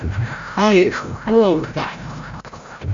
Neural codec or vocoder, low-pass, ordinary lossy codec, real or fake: codec, 16 kHz, 0.5 kbps, FreqCodec, larger model; 7.2 kHz; MP3, 48 kbps; fake